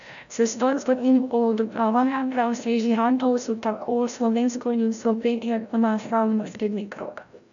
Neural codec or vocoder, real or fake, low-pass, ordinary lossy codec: codec, 16 kHz, 0.5 kbps, FreqCodec, larger model; fake; 7.2 kHz; none